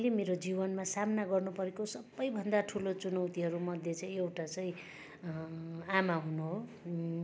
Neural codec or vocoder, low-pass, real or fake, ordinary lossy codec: none; none; real; none